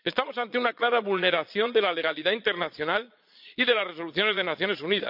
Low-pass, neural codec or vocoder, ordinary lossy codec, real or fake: 5.4 kHz; vocoder, 22.05 kHz, 80 mel bands, Vocos; none; fake